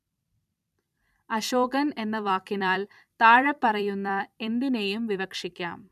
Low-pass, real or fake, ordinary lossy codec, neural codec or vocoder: 14.4 kHz; fake; none; vocoder, 44.1 kHz, 128 mel bands every 256 samples, BigVGAN v2